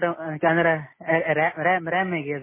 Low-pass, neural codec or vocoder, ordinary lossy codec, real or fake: 3.6 kHz; none; MP3, 16 kbps; real